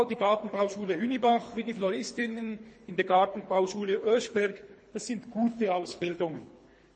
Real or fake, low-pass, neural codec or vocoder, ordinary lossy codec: fake; 9.9 kHz; codec, 24 kHz, 3 kbps, HILCodec; MP3, 32 kbps